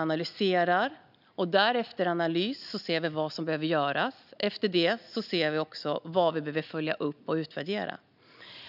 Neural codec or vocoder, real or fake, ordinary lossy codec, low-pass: none; real; none; 5.4 kHz